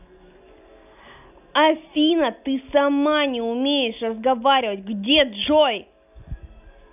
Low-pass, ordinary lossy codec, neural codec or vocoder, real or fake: 3.6 kHz; none; none; real